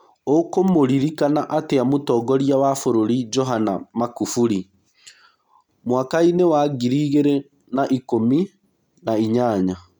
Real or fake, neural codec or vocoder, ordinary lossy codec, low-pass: real; none; none; 19.8 kHz